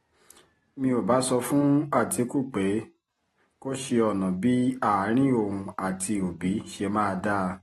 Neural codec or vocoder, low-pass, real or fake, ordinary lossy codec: none; 19.8 kHz; real; AAC, 32 kbps